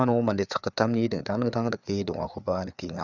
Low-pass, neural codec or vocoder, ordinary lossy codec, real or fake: 7.2 kHz; codec, 16 kHz, 4 kbps, FreqCodec, larger model; none; fake